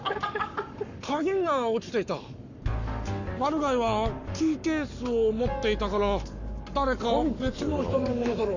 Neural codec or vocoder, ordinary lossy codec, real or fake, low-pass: codec, 44.1 kHz, 7.8 kbps, Pupu-Codec; none; fake; 7.2 kHz